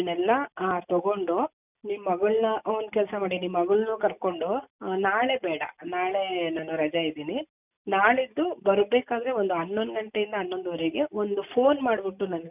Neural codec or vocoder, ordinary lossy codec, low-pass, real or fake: none; none; 3.6 kHz; real